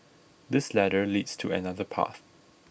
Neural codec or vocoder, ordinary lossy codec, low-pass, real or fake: none; none; none; real